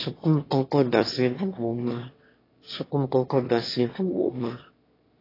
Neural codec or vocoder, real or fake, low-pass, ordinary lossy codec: autoencoder, 22.05 kHz, a latent of 192 numbers a frame, VITS, trained on one speaker; fake; 5.4 kHz; AAC, 24 kbps